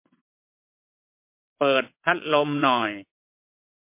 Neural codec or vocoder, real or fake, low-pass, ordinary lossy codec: vocoder, 22.05 kHz, 80 mel bands, WaveNeXt; fake; 3.6 kHz; MP3, 32 kbps